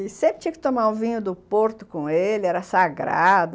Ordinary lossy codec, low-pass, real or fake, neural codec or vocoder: none; none; real; none